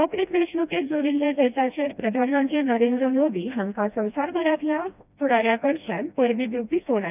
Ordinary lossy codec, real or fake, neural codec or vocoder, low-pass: none; fake; codec, 16 kHz, 1 kbps, FreqCodec, smaller model; 3.6 kHz